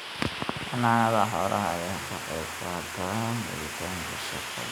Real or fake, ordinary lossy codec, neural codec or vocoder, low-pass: real; none; none; none